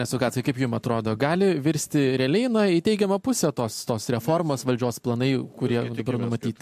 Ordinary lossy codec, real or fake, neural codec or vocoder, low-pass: MP3, 64 kbps; real; none; 14.4 kHz